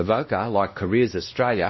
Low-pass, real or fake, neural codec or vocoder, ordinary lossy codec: 7.2 kHz; fake; codec, 16 kHz, 1 kbps, X-Codec, WavLM features, trained on Multilingual LibriSpeech; MP3, 24 kbps